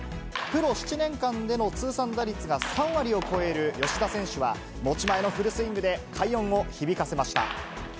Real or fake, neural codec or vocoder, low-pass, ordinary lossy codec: real; none; none; none